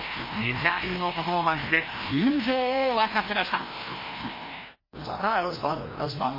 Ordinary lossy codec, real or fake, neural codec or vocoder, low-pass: MP3, 24 kbps; fake; codec, 16 kHz, 1 kbps, FreqCodec, larger model; 5.4 kHz